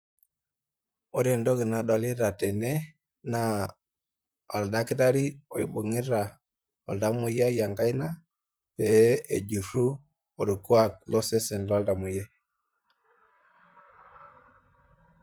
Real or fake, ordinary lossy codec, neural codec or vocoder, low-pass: fake; none; vocoder, 44.1 kHz, 128 mel bands, Pupu-Vocoder; none